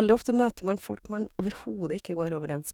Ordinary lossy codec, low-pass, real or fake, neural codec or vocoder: none; 19.8 kHz; fake; codec, 44.1 kHz, 2.6 kbps, DAC